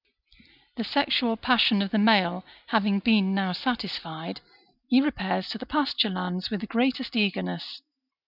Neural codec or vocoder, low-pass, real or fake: none; 5.4 kHz; real